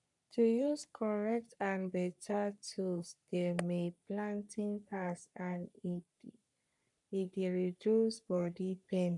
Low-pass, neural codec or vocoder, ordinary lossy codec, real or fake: 10.8 kHz; codec, 44.1 kHz, 3.4 kbps, Pupu-Codec; none; fake